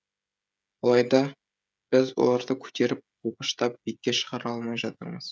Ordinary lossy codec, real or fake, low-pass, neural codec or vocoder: none; fake; none; codec, 16 kHz, 16 kbps, FreqCodec, smaller model